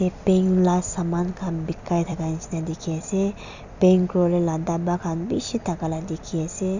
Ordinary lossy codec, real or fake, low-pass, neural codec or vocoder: none; fake; 7.2 kHz; vocoder, 44.1 kHz, 128 mel bands every 256 samples, BigVGAN v2